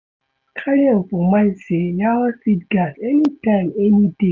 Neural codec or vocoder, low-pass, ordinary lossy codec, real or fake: none; 7.2 kHz; none; real